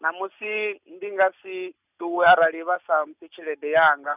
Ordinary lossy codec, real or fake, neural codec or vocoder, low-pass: none; real; none; 3.6 kHz